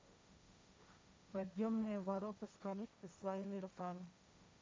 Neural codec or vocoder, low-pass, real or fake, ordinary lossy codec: codec, 16 kHz, 1.1 kbps, Voila-Tokenizer; none; fake; none